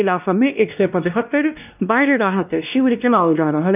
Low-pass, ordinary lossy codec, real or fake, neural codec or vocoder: 3.6 kHz; none; fake; codec, 16 kHz, 1 kbps, X-Codec, WavLM features, trained on Multilingual LibriSpeech